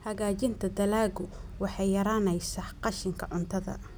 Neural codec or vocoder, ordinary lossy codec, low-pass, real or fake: none; none; none; real